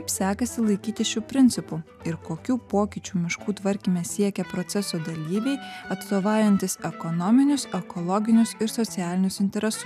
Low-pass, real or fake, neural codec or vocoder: 14.4 kHz; real; none